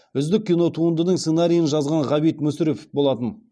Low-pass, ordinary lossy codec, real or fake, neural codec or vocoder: none; none; real; none